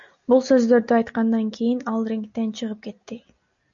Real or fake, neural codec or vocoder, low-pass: real; none; 7.2 kHz